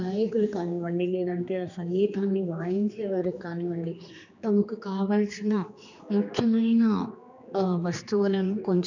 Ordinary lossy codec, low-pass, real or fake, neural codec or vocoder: none; 7.2 kHz; fake; codec, 16 kHz, 2 kbps, X-Codec, HuBERT features, trained on general audio